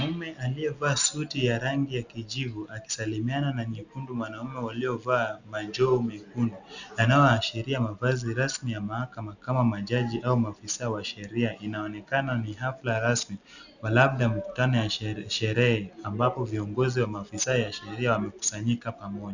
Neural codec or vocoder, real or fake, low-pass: none; real; 7.2 kHz